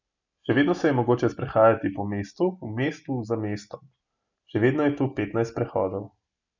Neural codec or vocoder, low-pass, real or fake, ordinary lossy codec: none; 7.2 kHz; real; none